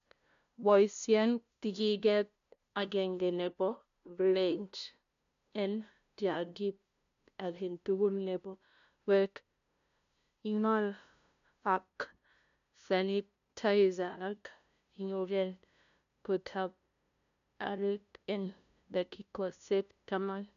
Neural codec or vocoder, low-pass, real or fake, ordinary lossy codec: codec, 16 kHz, 0.5 kbps, FunCodec, trained on LibriTTS, 25 frames a second; 7.2 kHz; fake; none